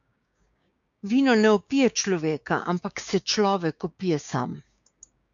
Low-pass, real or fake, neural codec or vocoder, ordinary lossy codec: 7.2 kHz; fake; codec, 16 kHz, 6 kbps, DAC; MP3, 96 kbps